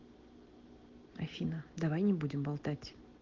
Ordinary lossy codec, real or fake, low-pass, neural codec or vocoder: Opus, 16 kbps; real; 7.2 kHz; none